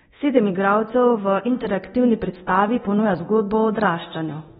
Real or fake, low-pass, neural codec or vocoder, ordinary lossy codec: fake; 10.8 kHz; codec, 24 kHz, 0.9 kbps, DualCodec; AAC, 16 kbps